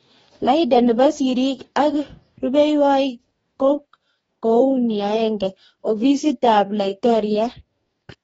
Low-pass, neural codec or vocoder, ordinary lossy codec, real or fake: 19.8 kHz; codec, 44.1 kHz, 2.6 kbps, DAC; AAC, 24 kbps; fake